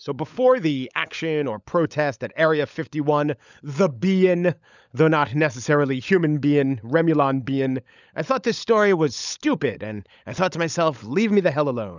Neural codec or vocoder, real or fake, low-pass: codec, 16 kHz, 16 kbps, FunCodec, trained on LibriTTS, 50 frames a second; fake; 7.2 kHz